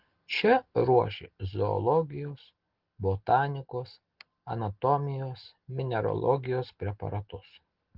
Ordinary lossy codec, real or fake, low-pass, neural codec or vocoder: Opus, 16 kbps; real; 5.4 kHz; none